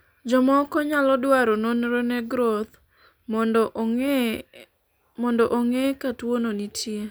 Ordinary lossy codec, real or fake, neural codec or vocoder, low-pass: none; real; none; none